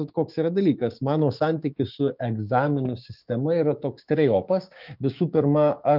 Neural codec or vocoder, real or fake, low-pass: codec, 16 kHz, 6 kbps, DAC; fake; 5.4 kHz